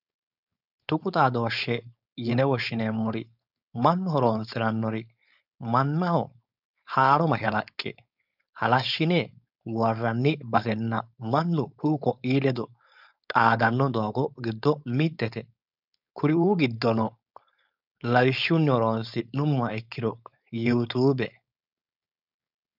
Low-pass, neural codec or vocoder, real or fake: 5.4 kHz; codec, 16 kHz, 4.8 kbps, FACodec; fake